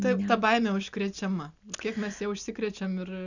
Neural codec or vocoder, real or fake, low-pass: none; real; 7.2 kHz